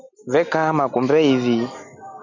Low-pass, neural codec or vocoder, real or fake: 7.2 kHz; none; real